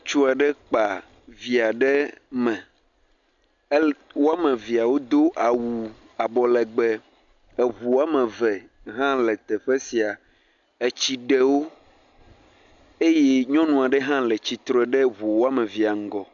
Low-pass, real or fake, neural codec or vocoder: 7.2 kHz; real; none